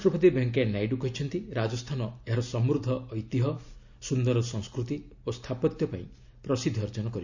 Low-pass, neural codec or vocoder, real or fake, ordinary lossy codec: 7.2 kHz; none; real; none